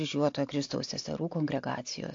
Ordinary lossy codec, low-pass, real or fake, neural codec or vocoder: AAC, 48 kbps; 7.2 kHz; real; none